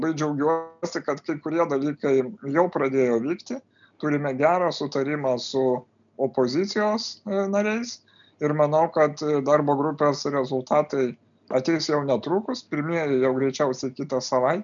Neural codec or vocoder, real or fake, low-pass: none; real; 7.2 kHz